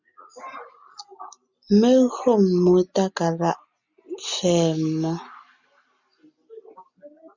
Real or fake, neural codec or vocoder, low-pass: real; none; 7.2 kHz